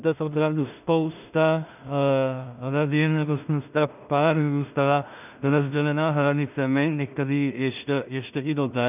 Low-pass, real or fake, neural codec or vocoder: 3.6 kHz; fake; codec, 16 kHz in and 24 kHz out, 0.4 kbps, LongCat-Audio-Codec, two codebook decoder